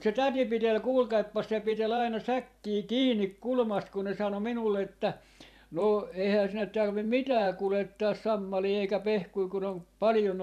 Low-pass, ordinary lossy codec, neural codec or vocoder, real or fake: 14.4 kHz; AAC, 96 kbps; vocoder, 44.1 kHz, 128 mel bands every 512 samples, BigVGAN v2; fake